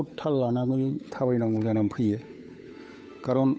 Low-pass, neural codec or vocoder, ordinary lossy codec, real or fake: none; codec, 16 kHz, 8 kbps, FunCodec, trained on Chinese and English, 25 frames a second; none; fake